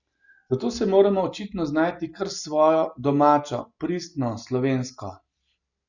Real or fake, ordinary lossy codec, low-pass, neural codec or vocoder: real; none; 7.2 kHz; none